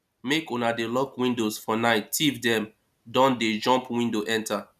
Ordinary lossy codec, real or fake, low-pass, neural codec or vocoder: none; real; 14.4 kHz; none